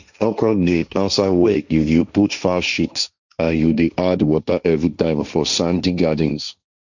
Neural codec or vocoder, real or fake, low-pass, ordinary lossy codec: codec, 16 kHz, 1.1 kbps, Voila-Tokenizer; fake; 7.2 kHz; none